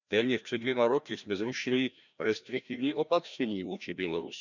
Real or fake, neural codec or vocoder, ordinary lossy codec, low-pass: fake; codec, 16 kHz, 1 kbps, FreqCodec, larger model; none; 7.2 kHz